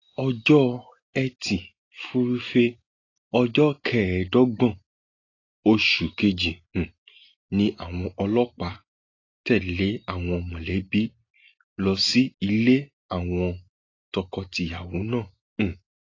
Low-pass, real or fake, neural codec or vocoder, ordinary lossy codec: 7.2 kHz; real; none; AAC, 32 kbps